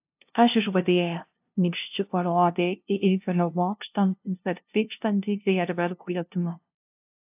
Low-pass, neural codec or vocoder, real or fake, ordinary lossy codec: 3.6 kHz; codec, 16 kHz, 0.5 kbps, FunCodec, trained on LibriTTS, 25 frames a second; fake; AAC, 32 kbps